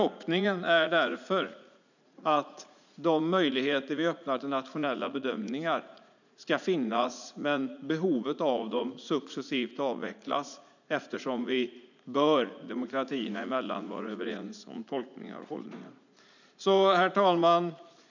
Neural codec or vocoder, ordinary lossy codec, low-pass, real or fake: vocoder, 44.1 kHz, 80 mel bands, Vocos; none; 7.2 kHz; fake